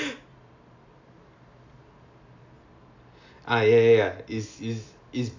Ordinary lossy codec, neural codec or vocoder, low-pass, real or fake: AAC, 48 kbps; none; 7.2 kHz; real